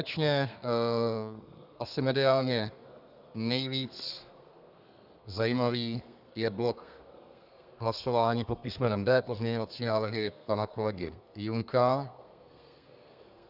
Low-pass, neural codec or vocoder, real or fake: 5.4 kHz; codec, 32 kHz, 1.9 kbps, SNAC; fake